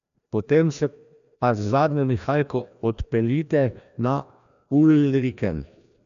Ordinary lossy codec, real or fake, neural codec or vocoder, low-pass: none; fake; codec, 16 kHz, 1 kbps, FreqCodec, larger model; 7.2 kHz